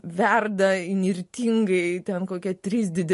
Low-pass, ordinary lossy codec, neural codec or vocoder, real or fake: 14.4 kHz; MP3, 48 kbps; none; real